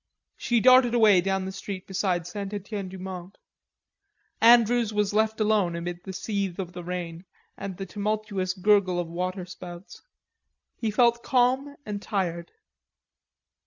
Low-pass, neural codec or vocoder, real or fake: 7.2 kHz; none; real